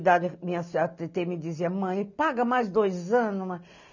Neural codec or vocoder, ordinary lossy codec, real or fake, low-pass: none; none; real; 7.2 kHz